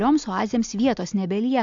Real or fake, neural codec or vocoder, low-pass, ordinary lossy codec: real; none; 7.2 kHz; AAC, 64 kbps